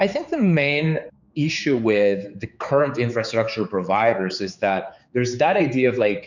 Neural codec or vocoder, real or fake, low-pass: codec, 16 kHz, 4 kbps, X-Codec, HuBERT features, trained on general audio; fake; 7.2 kHz